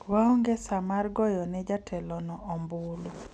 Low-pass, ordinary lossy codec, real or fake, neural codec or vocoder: none; none; real; none